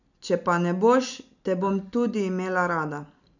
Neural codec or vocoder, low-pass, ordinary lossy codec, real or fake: none; 7.2 kHz; none; real